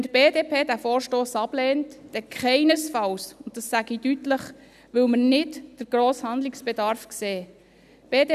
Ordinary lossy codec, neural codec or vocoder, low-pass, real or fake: none; none; 14.4 kHz; real